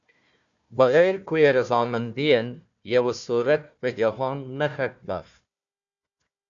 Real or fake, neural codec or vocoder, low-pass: fake; codec, 16 kHz, 1 kbps, FunCodec, trained on Chinese and English, 50 frames a second; 7.2 kHz